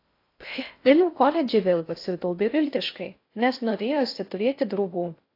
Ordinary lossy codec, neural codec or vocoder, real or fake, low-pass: AAC, 32 kbps; codec, 16 kHz in and 24 kHz out, 0.6 kbps, FocalCodec, streaming, 4096 codes; fake; 5.4 kHz